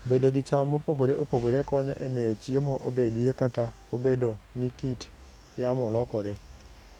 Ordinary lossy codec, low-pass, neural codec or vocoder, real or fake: none; 19.8 kHz; codec, 44.1 kHz, 2.6 kbps, DAC; fake